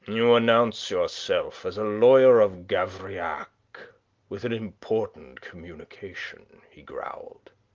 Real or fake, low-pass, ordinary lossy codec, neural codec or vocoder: real; 7.2 kHz; Opus, 32 kbps; none